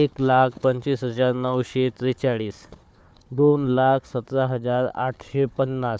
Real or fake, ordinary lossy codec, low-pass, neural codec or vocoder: fake; none; none; codec, 16 kHz, 4 kbps, FunCodec, trained on Chinese and English, 50 frames a second